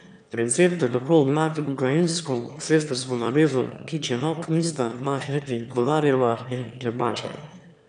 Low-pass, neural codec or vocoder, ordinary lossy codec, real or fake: 9.9 kHz; autoencoder, 22.05 kHz, a latent of 192 numbers a frame, VITS, trained on one speaker; none; fake